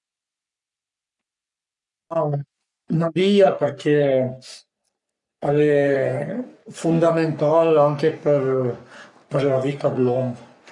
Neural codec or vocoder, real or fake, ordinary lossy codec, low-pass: codec, 44.1 kHz, 3.4 kbps, Pupu-Codec; fake; none; 10.8 kHz